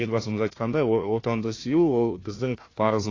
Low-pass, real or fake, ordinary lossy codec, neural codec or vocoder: 7.2 kHz; fake; AAC, 32 kbps; codec, 16 kHz, 1 kbps, FunCodec, trained on Chinese and English, 50 frames a second